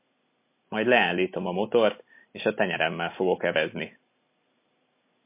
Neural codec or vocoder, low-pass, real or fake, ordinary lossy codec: none; 3.6 kHz; real; MP3, 24 kbps